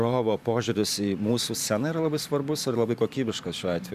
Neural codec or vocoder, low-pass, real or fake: vocoder, 44.1 kHz, 128 mel bands every 512 samples, BigVGAN v2; 14.4 kHz; fake